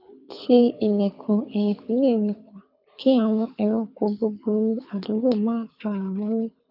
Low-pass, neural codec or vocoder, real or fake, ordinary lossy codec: 5.4 kHz; codec, 24 kHz, 6 kbps, HILCodec; fake; none